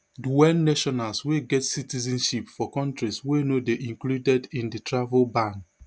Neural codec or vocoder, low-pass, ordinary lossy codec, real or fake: none; none; none; real